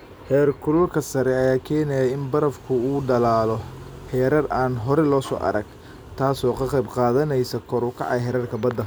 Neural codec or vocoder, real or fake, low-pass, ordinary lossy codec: none; real; none; none